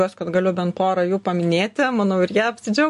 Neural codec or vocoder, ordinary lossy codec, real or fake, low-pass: none; MP3, 48 kbps; real; 14.4 kHz